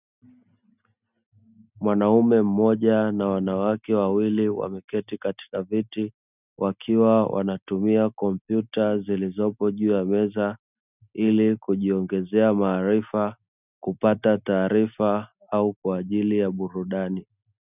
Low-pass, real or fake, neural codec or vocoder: 3.6 kHz; real; none